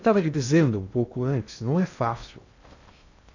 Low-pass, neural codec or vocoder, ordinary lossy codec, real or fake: 7.2 kHz; codec, 16 kHz in and 24 kHz out, 0.6 kbps, FocalCodec, streaming, 2048 codes; none; fake